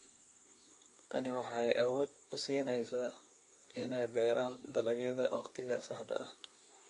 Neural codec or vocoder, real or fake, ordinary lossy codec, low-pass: codec, 24 kHz, 1 kbps, SNAC; fake; AAC, 48 kbps; 10.8 kHz